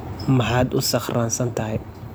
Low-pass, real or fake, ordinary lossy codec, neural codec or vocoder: none; fake; none; vocoder, 44.1 kHz, 128 mel bands every 256 samples, BigVGAN v2